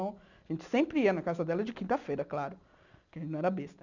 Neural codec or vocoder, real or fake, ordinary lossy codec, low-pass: none; real; none; 7.2 kHz